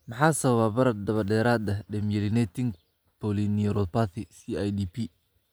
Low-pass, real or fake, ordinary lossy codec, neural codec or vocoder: none; real; none; none